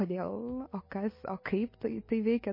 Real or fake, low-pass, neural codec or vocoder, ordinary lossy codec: real; 5.4 kHz; none; MP3, 24 kbps